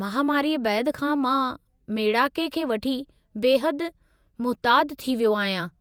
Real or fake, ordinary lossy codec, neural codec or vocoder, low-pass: fake; none; vocoder, 48 kHz, 128 mel bands, Vocos; none